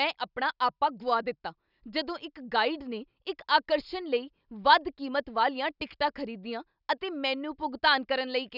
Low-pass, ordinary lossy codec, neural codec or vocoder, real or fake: 5.4 kHz; none; none; real